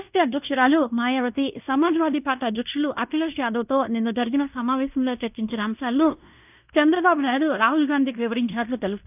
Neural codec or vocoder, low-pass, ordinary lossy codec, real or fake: codec, 16 kHz in and 24 kHz out, 0.9 kbps, LongCat-Audio-Codec, fine tuned four codebook decoder; 3.6 kHz; none; fake